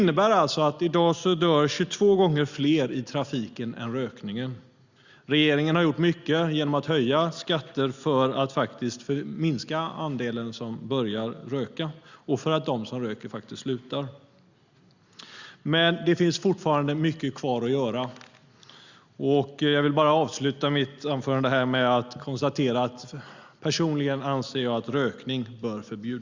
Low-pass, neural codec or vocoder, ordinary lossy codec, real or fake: 7.2 kHz; none; Opus, 64 kbps; real